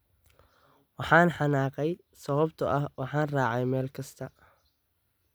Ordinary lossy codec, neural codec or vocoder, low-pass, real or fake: none; none; none; real